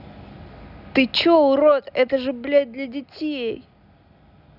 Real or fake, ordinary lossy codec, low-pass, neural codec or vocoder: real; none; 5.4 kHz; none